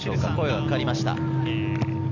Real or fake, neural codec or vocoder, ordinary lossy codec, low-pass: real; none; none; 7.2 kHz